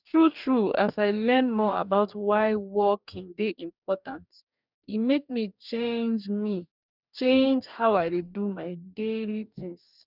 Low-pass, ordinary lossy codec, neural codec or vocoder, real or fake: 5.4 kHz; none; codec, 44.1 kHz, 2.6 kbps, DAC; fake